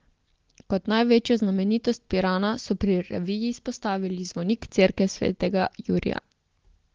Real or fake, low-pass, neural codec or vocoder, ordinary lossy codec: real; 7.2 kHz; none; Opus, 16 kbps